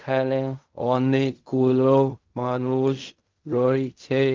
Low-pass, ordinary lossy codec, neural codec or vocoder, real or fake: 7.2 kHz; Opus, 24 kbps; codec, 16 kHz in and 24 kHz out, 0.4 kbps, LongCat-Audio-Codec, fine tuned four codebook decoder; fake